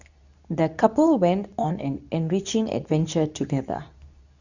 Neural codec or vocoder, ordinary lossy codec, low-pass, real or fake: codec, 24 kHz, 0.9 kbps, WavTokenizer, medium speech release version 2; none; 7.2 kHz; fake